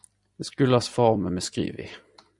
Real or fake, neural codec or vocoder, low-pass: fake; vocoder, 44.1 kHz, 128 mel bands every 256 samples, BigVGAN v2; 10.8 kHz